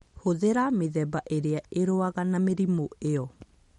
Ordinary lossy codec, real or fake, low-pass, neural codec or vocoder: MP3, 48 kbps; real; 19.8 kHz; none